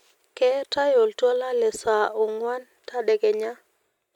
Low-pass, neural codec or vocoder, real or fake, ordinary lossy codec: 19.8 kHz; none; real; MP3, 96 kbps